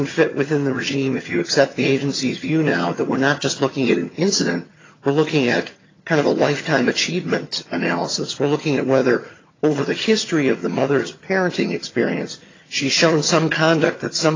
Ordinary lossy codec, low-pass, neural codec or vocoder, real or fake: AAC, 32 kbps; 7.2 kHz; vocoder, 22.05 kHz, 80 mel bands, HiFi-GAN; fake